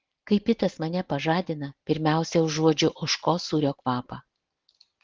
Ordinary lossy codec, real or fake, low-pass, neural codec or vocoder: Opus, 16 kbps; real; 7.2 kHz; none